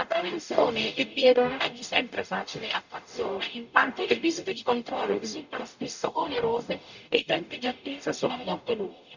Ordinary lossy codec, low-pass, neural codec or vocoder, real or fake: none; 7.2 kHz; codec, 44.1 kHz, 0.9 kbps, DAC; fake